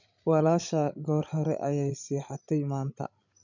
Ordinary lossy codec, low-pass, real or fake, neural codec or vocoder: none; 7.2 kHz; fake; codec, 16 kHz, 16 kbps, FreqCodec, larger model